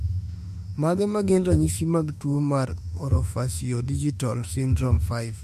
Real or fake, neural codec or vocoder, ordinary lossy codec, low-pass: fake; autoencoder, 48 kHz, 32 numbers a frame, DAC-VAE, trained on Japanese speech; MP3, 64 kbps; 14.4 kHz